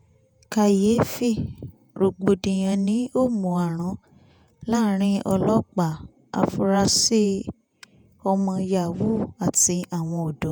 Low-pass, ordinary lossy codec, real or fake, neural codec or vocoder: none; none; fake; vocoder, 48 kHz, 128 mel bands, Vocos